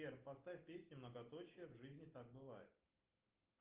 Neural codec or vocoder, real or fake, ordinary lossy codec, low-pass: none; real; Opus, 32 kbps; 3.6 kHz